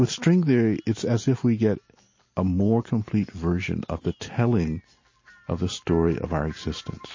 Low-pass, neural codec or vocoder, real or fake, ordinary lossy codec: 7.2 kHz; none; real; MP3, 32 kbps